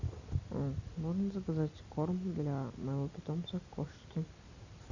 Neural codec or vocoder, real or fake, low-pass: none; real; 7.2 kHz